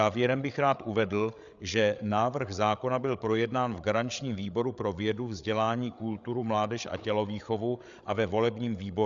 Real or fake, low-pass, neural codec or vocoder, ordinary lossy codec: fake; 7.2 kHz; codec, 16 kHz, 8 kbps, FreqCodec, larger model; Opus, 64 kbps